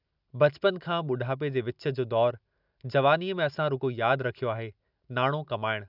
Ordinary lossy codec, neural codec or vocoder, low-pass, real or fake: none; none; 5.4 kHz; real